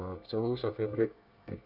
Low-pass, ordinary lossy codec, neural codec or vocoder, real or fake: 5.4 kHz; none; codec, 24 kHz, 1 kbps, SNAC; fake